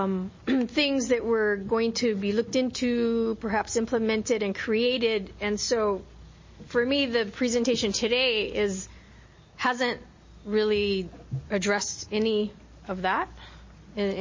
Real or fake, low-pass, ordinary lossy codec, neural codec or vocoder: real; 7.2 kHz; MP3, 32 kbps; none